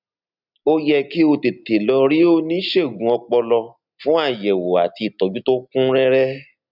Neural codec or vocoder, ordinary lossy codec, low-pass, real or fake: none; none; 5.4 kHz; real